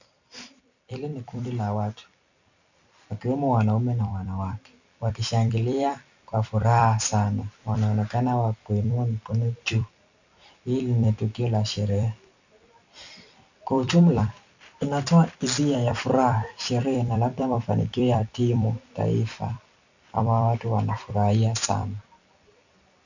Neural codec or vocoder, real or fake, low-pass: vocoder, 44.1 kHz, 128 mel bands every 256 samples, BigVGAN v2; fake; 7.2 kHz